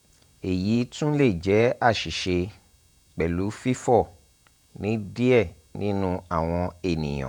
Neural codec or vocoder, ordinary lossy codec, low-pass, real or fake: vocoder, 48 kHz, 128 mel bands, Vocos; none; 19.8 kHz; fake